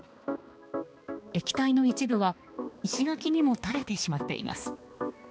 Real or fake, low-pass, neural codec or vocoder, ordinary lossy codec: fake; none; codec, 16 kHz, 2 kbps, X-Codec, HuBERT features, trained on balanced general audio; none